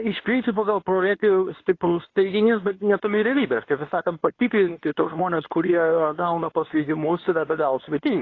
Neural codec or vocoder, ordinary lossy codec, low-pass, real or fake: codec, 24 kHz, 0.9 kbps, WavTokenizer, medium speech release version 2; AAC, 32 kbps; 7.2 kHz; fake